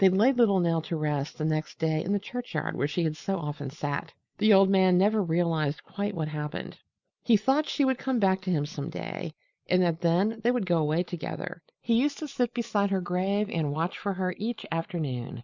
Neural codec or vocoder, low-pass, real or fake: none; 7.2 kHz; real